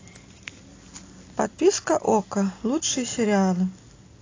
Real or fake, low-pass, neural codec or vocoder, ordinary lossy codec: real; 7.2 kHz; none; AAC, 32 kbps